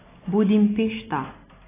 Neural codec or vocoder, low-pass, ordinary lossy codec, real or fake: none; 3.6 kHz; AAC, 16 kbps; real